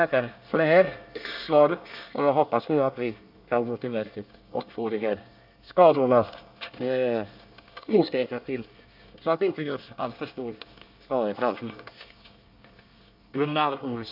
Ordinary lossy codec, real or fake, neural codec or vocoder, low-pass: none; fake; codec, 24 kHz, 1 kbps, SNAC; 5.4 kHz